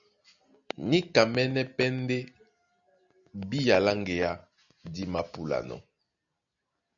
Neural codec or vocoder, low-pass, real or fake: none; 7.2 kHz; real